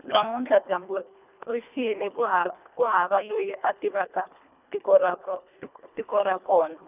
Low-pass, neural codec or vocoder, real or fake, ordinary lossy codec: 3.6 kHz; codec, 24 kHz, 1.5 kbps, HILCodec; fake; none